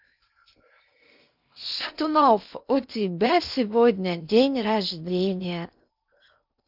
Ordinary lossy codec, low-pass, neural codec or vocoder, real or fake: none; 5.4 kHz; codec, 16 kHz in and 24 kHz out, 0.6 kbps, FocalCodec, streaming, 2048 codes; fake